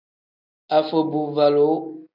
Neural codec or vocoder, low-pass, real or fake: none; 5.4 kHz; real